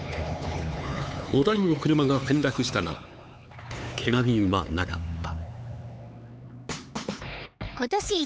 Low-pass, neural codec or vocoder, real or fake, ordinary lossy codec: none; codec, 16 kHz, 4 kbps, X-Codec, HuBERT features, trained on LibriSpeech; fake; none